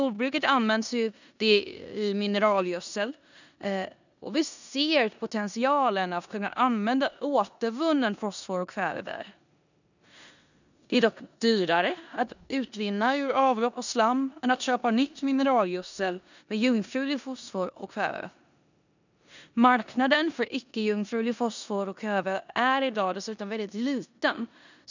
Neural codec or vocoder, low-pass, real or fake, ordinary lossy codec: codec, 16 kHz in and 24 kHz out, 0.9 kbps, LongCat-Audio-Codec, four codebook decoder; 7.2 kHz; fake; none